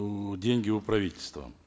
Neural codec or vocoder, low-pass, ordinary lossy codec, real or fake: none; none; none; real